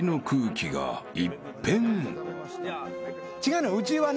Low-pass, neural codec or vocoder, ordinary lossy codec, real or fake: none; none; none; real